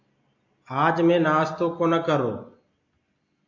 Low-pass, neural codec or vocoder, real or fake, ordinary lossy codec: 7.2 kHz; none; real; AAC, 48 kbps